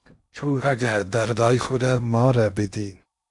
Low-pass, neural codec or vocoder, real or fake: 10.8 kHz; codec, 16 kHz in and 24 kHz out, 0.6 kbps, FocalCodec, streaming, 2048 codes; fake